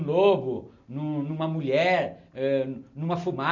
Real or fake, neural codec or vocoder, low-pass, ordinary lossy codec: real; none; 7.2 kHz; none